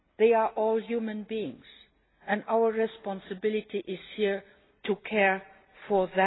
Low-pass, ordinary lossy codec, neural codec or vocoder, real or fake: 7.2 kHz; AAC, 16 kbps; none; real